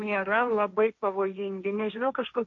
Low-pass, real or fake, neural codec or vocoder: 7.2 kHz; fake; codec, 16 kHz, 1.1 kbps, Voila-Tokenizer